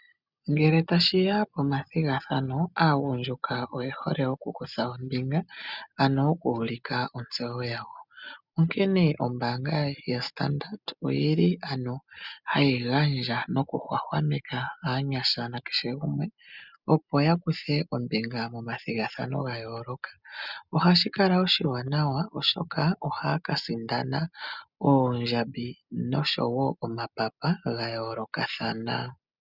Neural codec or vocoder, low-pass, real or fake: none; 5.4 kHz; real